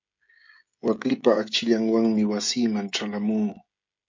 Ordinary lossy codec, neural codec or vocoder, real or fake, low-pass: MP3, 64 kbps; codec, 16 kHz, 16 kbps, FreqCodec, smaller model; fake; 7.2 kHz